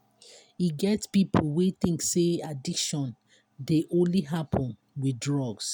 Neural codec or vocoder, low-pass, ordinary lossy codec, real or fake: none; none; none; real